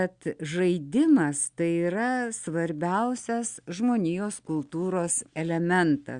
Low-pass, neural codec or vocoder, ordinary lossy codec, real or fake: 9.9 kHz; none; Opus, 64 kbps; real